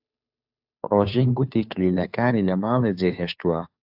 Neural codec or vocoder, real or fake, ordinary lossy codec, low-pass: codec, 16 kHz, 2 kbps, FunCodec, trained on Chinese and English, 25 frames a second; fake; AAC, 48 kbps; 5.4 kHz